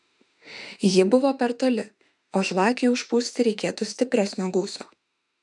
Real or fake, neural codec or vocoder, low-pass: fake; autoencoder, 48 kHz, 32 numbers a frame, DAC-VAE, trained on Japanese speech; 10.8 kHz